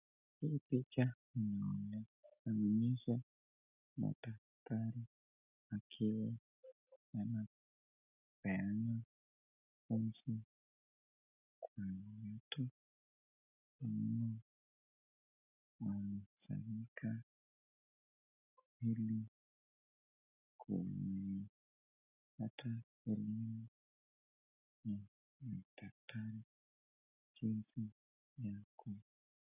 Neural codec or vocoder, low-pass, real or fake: none; 3.6 kHz; real